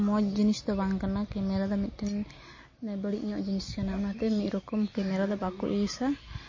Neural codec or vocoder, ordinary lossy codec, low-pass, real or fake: none; MP3, 32 kbps; 7.2 kHz; real